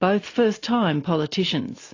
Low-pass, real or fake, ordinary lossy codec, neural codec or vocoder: 7.2 kHz; real; AAC, 32 kbps; none